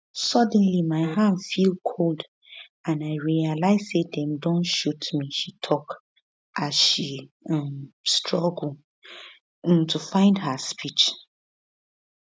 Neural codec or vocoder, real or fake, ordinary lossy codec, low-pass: none; real; none; none